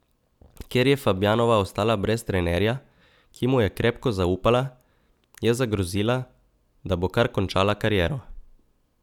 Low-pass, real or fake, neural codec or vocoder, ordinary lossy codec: 19.8 kHz; real; none; none